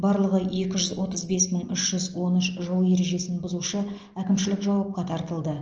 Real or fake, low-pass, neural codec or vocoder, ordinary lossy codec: real; 7.2 kHz; none; Opus, 24 kbps